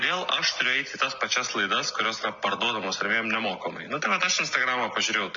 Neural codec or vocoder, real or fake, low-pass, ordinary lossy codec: none; real; 7.2 kHz; MP3, 48 kbps